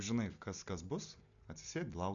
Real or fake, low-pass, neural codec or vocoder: real; 7.2 kHz; none